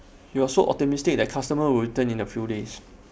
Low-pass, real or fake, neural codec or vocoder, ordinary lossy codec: none; real; none; none